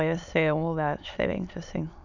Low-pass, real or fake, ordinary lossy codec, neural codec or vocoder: 7.2 kHz; fake; none; autoencoder, 22.05 kHz, a latent of 192 numbers a frame, VITS, trained on many speakers